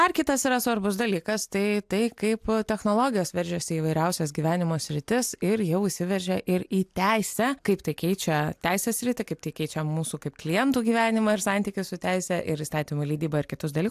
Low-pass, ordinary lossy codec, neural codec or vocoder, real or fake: 14.4 kHz; AAC, 96 kbps; none; real